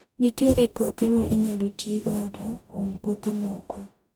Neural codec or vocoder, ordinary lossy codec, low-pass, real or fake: codec, 44.1 kHz, 0.9 kbps, DAC; none; none; fake